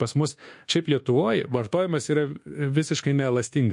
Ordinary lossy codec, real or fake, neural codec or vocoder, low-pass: MP3, 48 kbps; fake; codec, 24 kHz, 1.2 kbps, DualCodec; 10.8 kHz